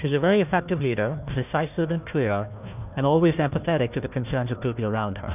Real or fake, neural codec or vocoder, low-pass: fake; codec, 16 kHz, 1 kbps, FunCodec, trained on Chinese and English, 50 frames a second; 3.6 kHz